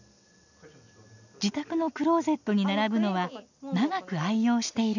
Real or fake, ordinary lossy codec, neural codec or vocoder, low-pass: real; none; none; 7.2 kHz